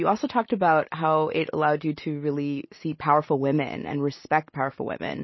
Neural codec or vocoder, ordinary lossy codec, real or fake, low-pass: none; MP3, 24 kbps; real; 7.2 kHz